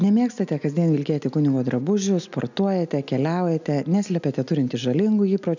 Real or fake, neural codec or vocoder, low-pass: real; none; 7.2 kHz